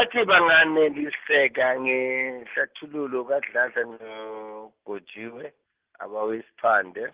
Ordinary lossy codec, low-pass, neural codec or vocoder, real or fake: Opus, 16 kbps; 3.6 kHz; none; real